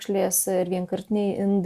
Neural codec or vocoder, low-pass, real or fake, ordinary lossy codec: none; 14.4 kHz; real; Opus, 64 kbps